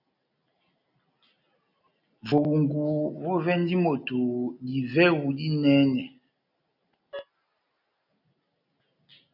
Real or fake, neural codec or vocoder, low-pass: real; none; 5.4 kHz